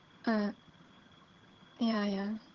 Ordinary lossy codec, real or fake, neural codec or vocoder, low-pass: Opus, 16 kbps; fake; vocoder, 22.05 kHz, 80 mel bands, HiFi-GAN; 7.2 kHz